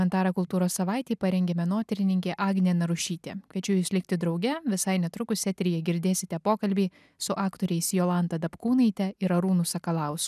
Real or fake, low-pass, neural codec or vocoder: real; 14.4 kHz; none